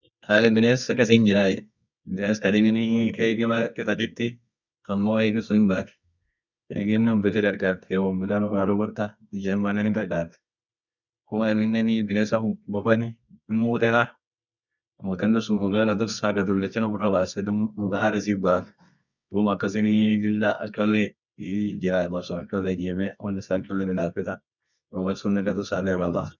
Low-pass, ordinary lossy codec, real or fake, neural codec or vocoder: 7.2 kHz; none; fake; codec, 24 kHz, 0.9 kbps, WavTokenizer, medium music audio release